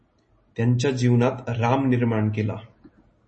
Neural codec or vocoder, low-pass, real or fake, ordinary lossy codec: none; 10.8 kHz; real; MP3, 32 kbps